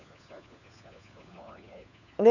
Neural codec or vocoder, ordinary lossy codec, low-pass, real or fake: codec, 16 kHz, 4 kbps, FunCodec, trained on LibriTTS, 50 frames a second; none; 7.2 kHz; fake